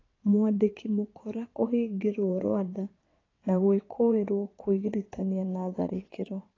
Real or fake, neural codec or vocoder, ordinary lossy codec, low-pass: fake; vocoder, 22.05 kHz, 80 mel bands, WaveNeXt; AAC, 32 kbps; 7.2 kHz